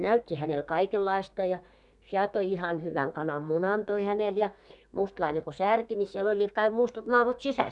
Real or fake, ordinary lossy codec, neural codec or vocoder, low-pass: fake; none; codec, 32 kHz, 1.9 kbps, SNAC; 10.8 kHz